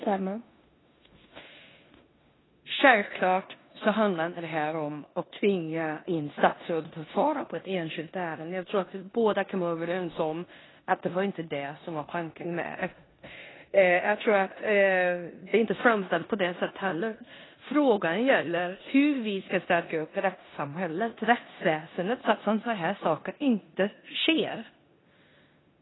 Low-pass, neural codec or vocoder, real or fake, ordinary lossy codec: 7.2 kHz; codec, 16 kHz in and 24 kHz out, 0.9 kbps, LongCat-Audio-Codec, four codebook decoder; fake; AAC, 16 kbps